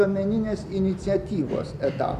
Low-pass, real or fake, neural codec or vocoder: 14.4 kHz; real; none